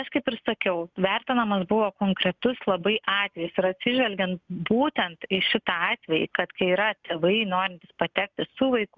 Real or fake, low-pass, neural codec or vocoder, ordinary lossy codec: real; 7.2 kHz; none; Opus, 64 kbps